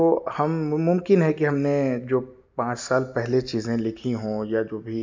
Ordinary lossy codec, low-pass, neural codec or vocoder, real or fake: MP3, 64 kbps; 7.2 kHz; none; real